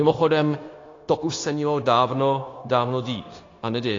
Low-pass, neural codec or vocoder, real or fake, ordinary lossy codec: 7.2 kHz; codec, 16 kHz, 0.9 kbps, LongCat-Audio-Codec; fake; AAC, 32 kbps